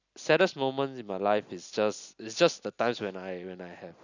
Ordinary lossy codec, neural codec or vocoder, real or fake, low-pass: none; none; real; 7.2 kHz